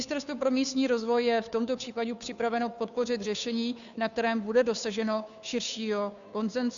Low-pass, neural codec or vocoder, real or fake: 7.2 kHz; codec, 16 kHz, 2 kbps, FunCodec, trained on Chinese and English, 25 frames a second; fake